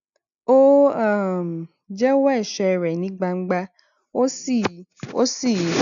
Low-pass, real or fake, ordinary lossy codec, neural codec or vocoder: 7.2 kHz; real; none; none